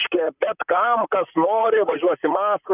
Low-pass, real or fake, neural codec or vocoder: 3.6 kHz; fake; vocoder, 44.1 kHz, 128 mel bands, Pupu-Vocoder